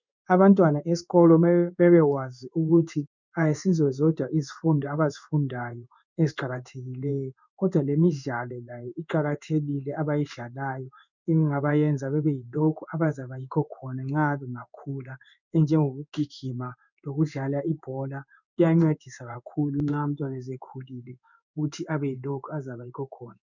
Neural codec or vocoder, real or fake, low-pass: codec, 16 kHz in and 24 kHz out, 1 kbps, XY-Tokenizer; fake; 7.2 kHz